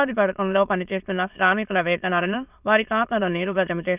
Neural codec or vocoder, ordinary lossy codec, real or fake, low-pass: autoencoder, 22.05 kHz, a latent of 192 numbers a frame, VITS, trained on many speakers; none; fake; 3.6 kHz